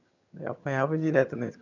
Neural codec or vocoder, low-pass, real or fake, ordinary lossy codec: vocoder, 22.05 kHz, 80 mel bands, HiFi-GAN; 7.2 kHz; fake; none